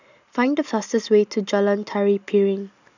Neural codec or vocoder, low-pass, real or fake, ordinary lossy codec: none; 7.2 kHz; real; none